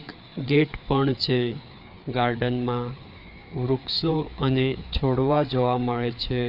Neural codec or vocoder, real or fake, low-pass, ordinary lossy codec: codec, 16 kHz, 4 kbps, FreqCodec, larger model; fake; 5.4 kHz; none